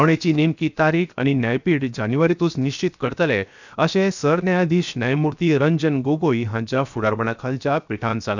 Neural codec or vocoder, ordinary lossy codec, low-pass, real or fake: codec, 16 kHz, 0.7 kbps, FocalCodec; none; 7.2 kHz; fake